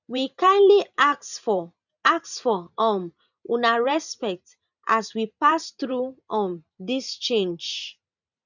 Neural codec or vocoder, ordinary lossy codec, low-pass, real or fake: vocoder, 44.1 kHz, 128 mel bands every 512 samples, BigVGAN v2; none; 7.2 kHz; fake